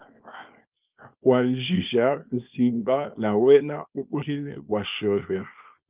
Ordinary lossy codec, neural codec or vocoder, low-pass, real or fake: Opus, 24 kbps; codec, 24 kHz, 0.9 kbps, WavTokenizer, small release; 3.6 kHz; fake